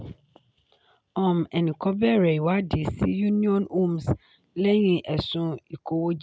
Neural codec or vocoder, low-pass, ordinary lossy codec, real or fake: none; none; none; real